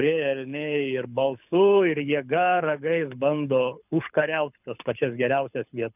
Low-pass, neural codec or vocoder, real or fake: 3.6 kHz; codec, 24 kHz, 6 kbps, HILCodec; fake